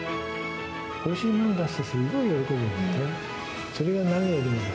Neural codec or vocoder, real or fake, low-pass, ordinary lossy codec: none; real; none; none